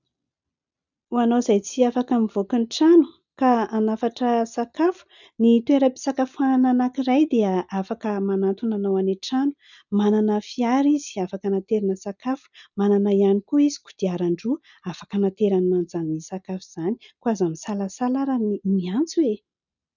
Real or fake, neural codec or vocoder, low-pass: real; none; 7.2 kHz